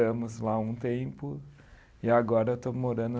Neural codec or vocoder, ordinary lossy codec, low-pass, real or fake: none; none; none; real